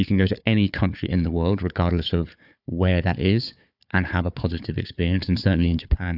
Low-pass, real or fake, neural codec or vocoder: 5.4 kHz; fake; codec, 16 kHz, 4 kbps, FunCodec, trained on Chinese and English, 50 frames a second